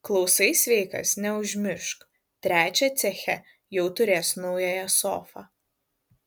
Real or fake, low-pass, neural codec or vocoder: real; 19.8 kHz; none